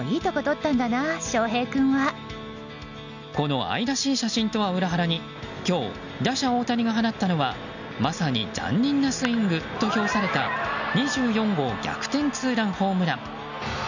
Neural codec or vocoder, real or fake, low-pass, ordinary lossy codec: none; real; 7.2 kHz; none